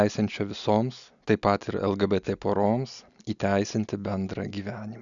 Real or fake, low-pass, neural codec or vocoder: real; 7.2 kHz; none